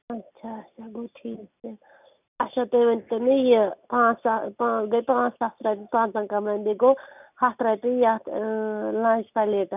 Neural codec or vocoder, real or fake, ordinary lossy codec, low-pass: none; real; none; 3.6 kHz